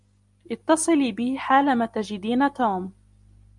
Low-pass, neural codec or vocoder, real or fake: 10.8 kHz; none; real